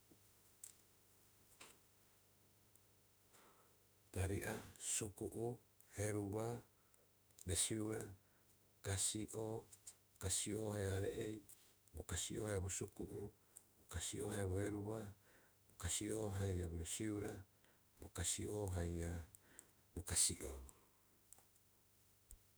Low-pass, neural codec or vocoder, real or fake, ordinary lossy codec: none; autoencoder, 48 kHz, 32 numbers a frame, DAC-VAE, trained on Japanese speech; fake; none